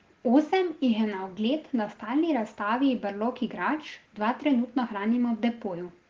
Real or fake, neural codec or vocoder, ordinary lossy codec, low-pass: real; none; Opus, 16 kbps; 7.2 kHz